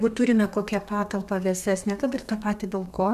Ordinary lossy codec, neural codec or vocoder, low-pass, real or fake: MP3, 96 kbps; codec, 32 kHz, 1.9 kbps, SNAC; 14.4 kHz; fake